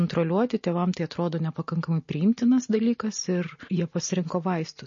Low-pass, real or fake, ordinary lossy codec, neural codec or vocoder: 7.2 kHz; real; MP3, 32 kbps; none